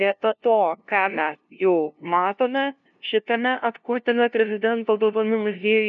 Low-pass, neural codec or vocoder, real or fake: 7.2 kHz; codec, 16 kHz, 0.5 kbps, FunCodec, trained on LibriTTS, 25 frames a second; fake